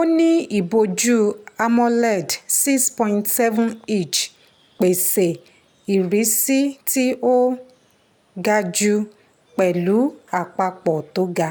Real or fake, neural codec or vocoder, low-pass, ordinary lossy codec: real; none; none; none